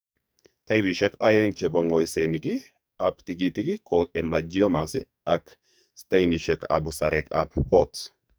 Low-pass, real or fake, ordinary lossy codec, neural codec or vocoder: none; fake; none; codec, 44.1 kHz, 2.6 kbps, SNAC